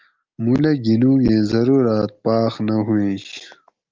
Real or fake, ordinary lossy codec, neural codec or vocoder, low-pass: real; Opus, 32 kbps; none; 7.2 kHz